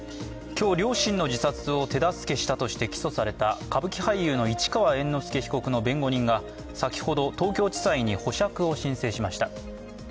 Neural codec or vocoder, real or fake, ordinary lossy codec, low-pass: none; real; none; none